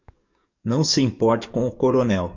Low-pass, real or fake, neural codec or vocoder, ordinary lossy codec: 7.2 kHz; fake; codec, 44.1 kHz, 7.8 kbps, DAC; MP3, 64 kbps